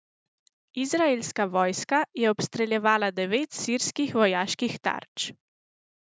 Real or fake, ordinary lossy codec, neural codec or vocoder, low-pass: real; none; none; none